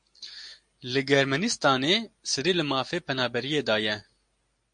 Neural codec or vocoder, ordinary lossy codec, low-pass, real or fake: none; MP3, 48 kbps; 9.9 kHz; real